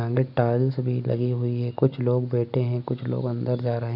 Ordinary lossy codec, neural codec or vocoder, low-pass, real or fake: none; none; 5.4 kHz; real